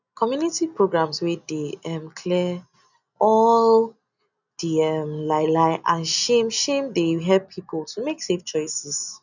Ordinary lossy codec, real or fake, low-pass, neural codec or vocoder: none; real; 7.2 kHz; none